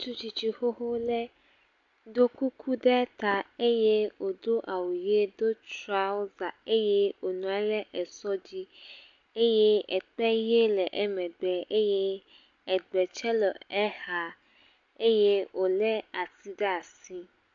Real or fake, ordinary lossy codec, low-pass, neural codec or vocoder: real; AAC, 64 kbps; 7.2 kHz; none